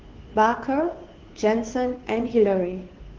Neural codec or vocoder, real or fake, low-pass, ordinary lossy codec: codec, 16 kHz, 2 kbps, FunCodec, trained on Chinese and English, 25 frames a second; fake; 7.2 kHz; Opus, 16 kbps